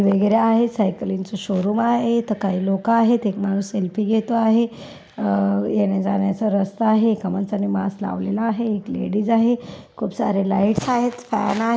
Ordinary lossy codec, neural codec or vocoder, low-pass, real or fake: none; none; none; real